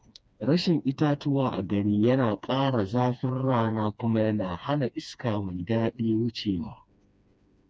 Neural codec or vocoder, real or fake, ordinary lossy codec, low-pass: codec, 16 kHz, 2 kbps, FreqCodec, smaller model; fake; none; none